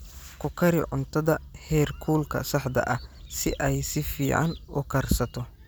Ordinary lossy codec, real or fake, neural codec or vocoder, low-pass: none; real; none; none